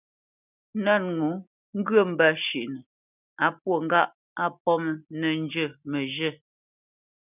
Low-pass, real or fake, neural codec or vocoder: 3.6 kHz; real; none